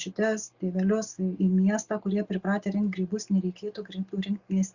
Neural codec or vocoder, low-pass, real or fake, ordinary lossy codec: none; 7.2 kHz; real; Opus, 64 kbps